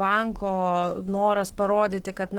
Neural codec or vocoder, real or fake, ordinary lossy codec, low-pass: autoencoder, 48 kHz, 32 numbers a frame, DAC-VAE, trained on Japanese speech; fake; Opus, 16 kbps; 19.8 kHz